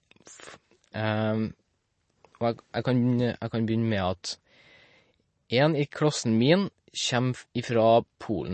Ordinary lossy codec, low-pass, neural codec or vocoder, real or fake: MP3, 32 kbps; 10.8 kHz; none; real